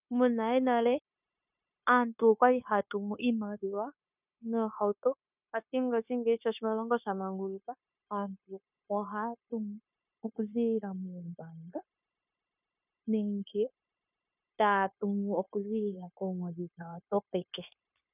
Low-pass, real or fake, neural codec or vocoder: 3.6 kHz; fake; codec, 16 kHz, 0.9 kbps, LongCat-Audio-Codec